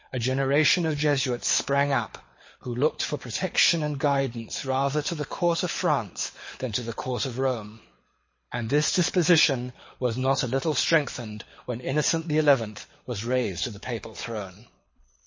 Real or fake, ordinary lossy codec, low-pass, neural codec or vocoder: fake; MP3, 32 kbps; 7.2 kHz; codec, 24 kHz, 6 kbps, HILCodec